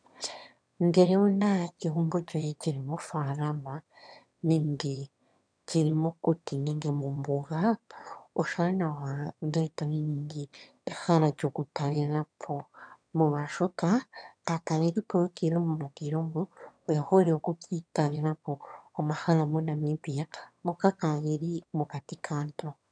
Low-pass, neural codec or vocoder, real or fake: 9.9 kHz; autoencoder, 22.05 kHz, a latent of 192 numbers a frame, VITS, trained on one speaker; fake